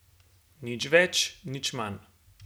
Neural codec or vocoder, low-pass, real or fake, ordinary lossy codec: none; none; real; none